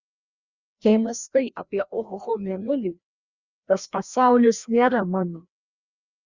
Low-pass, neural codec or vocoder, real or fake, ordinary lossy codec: 7.2 kHz; codec, 16 kHz, 1 kbps, FreqCodec, larger model; fake; Opus, 64 kbps